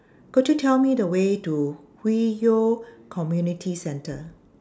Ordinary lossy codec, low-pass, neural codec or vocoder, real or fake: none; none; none; real